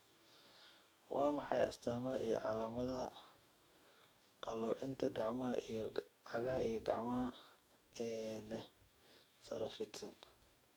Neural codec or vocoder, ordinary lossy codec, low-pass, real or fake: codec, 44.1 kHz, 2.6 kbps, DAC; none; none; fake